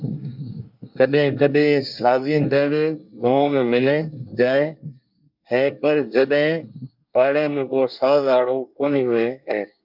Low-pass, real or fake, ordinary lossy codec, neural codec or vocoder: 5.4 kHz; fake; MP3, 48 kbps; codec, 24 kHz, 1 kbps, SNAC